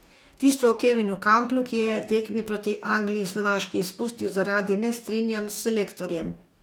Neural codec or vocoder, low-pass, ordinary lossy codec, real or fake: codec, 44.1 kHz, 2.6 kbps, DAC; 19.8 kHz; none; fake